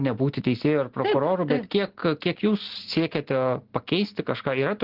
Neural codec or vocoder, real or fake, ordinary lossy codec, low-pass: none; real; Opus, 16 kbps; 5.4 kHz